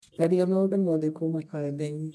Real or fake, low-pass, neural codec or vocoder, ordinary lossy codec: fake; none; codec, 24 kHz, 0.9 kbps, WavTokenizer, medium music audio release; none